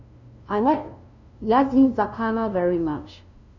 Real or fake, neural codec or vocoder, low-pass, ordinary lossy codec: fake; codec, 16 kHz, 0.5 kbps, FunCodec, trained on LibriTTS, 25 frames a second; 7.2 kHz; none